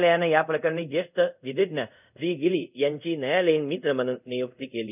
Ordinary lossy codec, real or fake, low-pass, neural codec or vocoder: none; fake; 3.6 kHz; codec, 24 kHz, 0.5 kbps, DualCodec